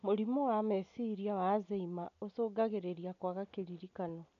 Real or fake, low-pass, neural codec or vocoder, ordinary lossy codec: real; 7.2 kHz; none; none